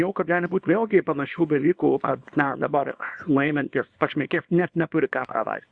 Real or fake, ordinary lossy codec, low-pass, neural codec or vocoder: fake; Opus, 64 kbps; 9.9 kHz; codec, 24 kHz, 0.9 kbps, WavTokenizer, small release